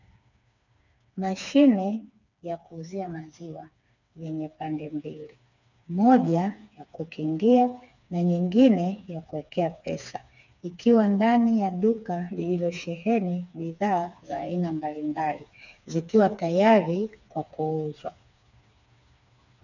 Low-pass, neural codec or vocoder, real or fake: 7.2 kHz; codec, 16 kHz, 4 kbps, FreqCodec, smaller model; fake